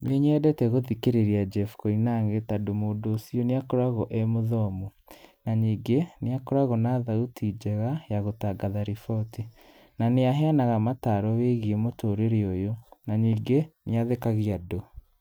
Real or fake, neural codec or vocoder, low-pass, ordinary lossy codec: real; none; none; none